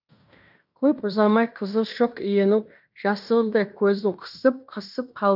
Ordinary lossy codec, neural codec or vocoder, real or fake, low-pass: none; codec, 16 kHz in and 24 kHz out, 0.9 kbps, LongCat-Audio-Codec, fine tuned four codebook decoder; fake; 5.4 kHz